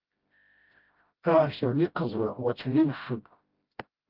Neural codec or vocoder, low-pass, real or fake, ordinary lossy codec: codec, 16 kHz, 0.5 kbps, FreqCodec, smaller model; 5.4 kHz; fake; Opus, 24 kbps